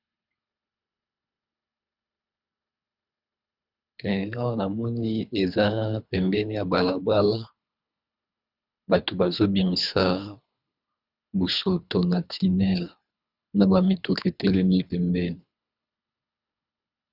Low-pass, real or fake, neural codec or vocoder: 5.4 kHz; fake; codec, 24 kHz, 3 kbps, HILCodec